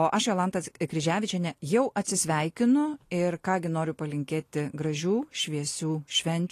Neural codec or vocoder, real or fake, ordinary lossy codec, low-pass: none; real; AAC, 48 kbps; 14.4 kHz